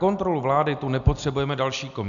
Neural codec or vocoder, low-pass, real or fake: none; 7.2 kHz; real